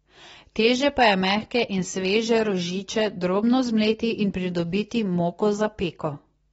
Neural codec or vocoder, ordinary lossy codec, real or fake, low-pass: none; AAC, 24 kbps; real; 10.8 kHz